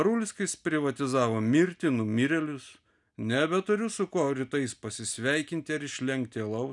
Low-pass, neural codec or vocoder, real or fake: 10.8 kHz; none; real